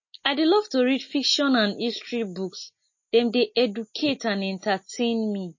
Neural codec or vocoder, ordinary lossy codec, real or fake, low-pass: none; MP3, 32 kbps; real; 7.2 kHz